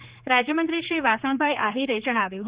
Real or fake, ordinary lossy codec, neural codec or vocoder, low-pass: fake; Opus, 64 kbps; codec, 16 kHz, 4 kbps, X-Codec, HuBERT features, trained on general audio; 3.6 kHz